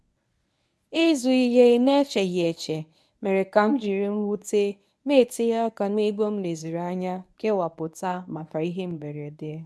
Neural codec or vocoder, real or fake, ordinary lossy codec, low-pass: codec, 24 kHz, 0.9 kbps, WavTokenizer, medium speech release version 1; fake; none; none